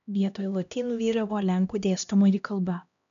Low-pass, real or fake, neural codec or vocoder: 7.2 kHz; fake; codec, 16 kHz, 1 kbps, X-Codec, HuBERT features, trained on LibriSpeech